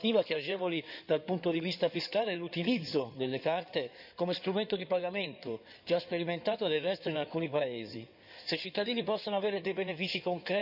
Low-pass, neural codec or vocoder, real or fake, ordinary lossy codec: 5.4 kHz; codec, 16 kHz in and 24 kHz out, 2.2 kbps, FireRedTTS-2 codec; fake; none